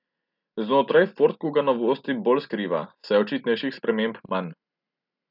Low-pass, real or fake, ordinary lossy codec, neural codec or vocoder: 5.4 kHz; real; none; none